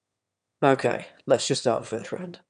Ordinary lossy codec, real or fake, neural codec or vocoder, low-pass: none; fake; autoencoder, 22.05 kHz, a latent of 192 numbers a frame, VITS, trained on one speaker; 9.9 kHz